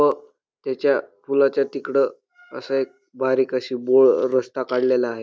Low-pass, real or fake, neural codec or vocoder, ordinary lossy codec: none; real; none; none